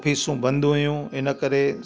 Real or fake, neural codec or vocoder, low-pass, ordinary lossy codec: real; none; none; none